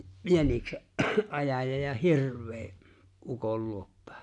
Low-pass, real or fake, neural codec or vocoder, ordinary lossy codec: 10.8 kHz; real; none; none